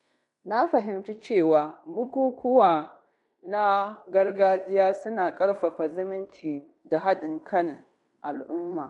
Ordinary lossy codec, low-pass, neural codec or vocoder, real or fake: MP3, 64 kbps; 10.8 kHz; codec, 16 kHz in and 24 kHz out, 0.9 kbps, LongCat-Audio-Codec, fine tuned four codebook decoder; fake